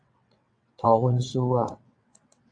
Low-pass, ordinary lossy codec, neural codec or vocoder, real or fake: 9.9 kHz; AAC, 64 kbps; vocoder, 22.05 kHz, 80 mel bands, WaveNeXt; fake